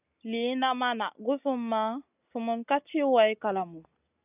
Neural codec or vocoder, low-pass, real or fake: none; 3.6 kHz; real